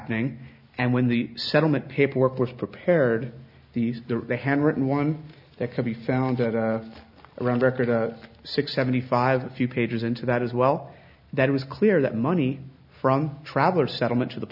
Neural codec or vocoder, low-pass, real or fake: none; 5.4 kHz; real